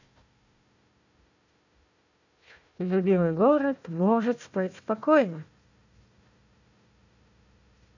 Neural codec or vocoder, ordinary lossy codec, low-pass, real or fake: codec, 16 kHz, 1 kbps, FunCodec, trained on Chinese and English, 50 frames a second; none; 7.2 kHz; fake